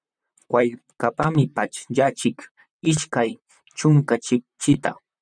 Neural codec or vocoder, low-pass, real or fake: vocoder, 44.1 kHz, 128 mel bands, Pupu-Vocoder; 9.9 kHz; fake